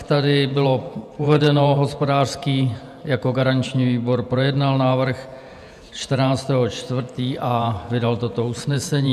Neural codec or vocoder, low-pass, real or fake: vocoder, 48 kHz, 128 mel bands, Vocos; 14.4 kHz; fake